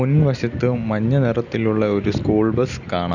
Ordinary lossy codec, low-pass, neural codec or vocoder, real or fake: none; 7.2 kHz; none; real